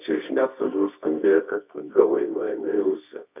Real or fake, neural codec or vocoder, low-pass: fake; codec, 24 kHz, 0.9 kbps, WavTokenizer, medium music audio release; 3.6 kHz